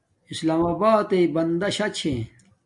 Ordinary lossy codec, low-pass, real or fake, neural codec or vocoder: MP3, 64 kbps; 10.8 kHz; real; none